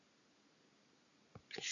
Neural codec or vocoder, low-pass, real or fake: none; 7.2 kHz; real